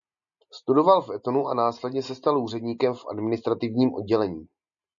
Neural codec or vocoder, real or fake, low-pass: none; real; 7.2 kHz